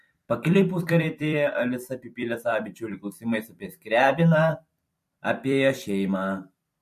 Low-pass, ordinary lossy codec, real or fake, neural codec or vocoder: 14.4 kHz; MP3, 64 kbps; fake; vocoder, 44.1 kHz, 128 mel bands every 256 samples, BigVGAN v2